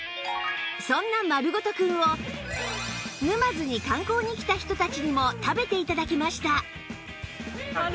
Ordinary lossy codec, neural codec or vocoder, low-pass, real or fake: none; none; none; real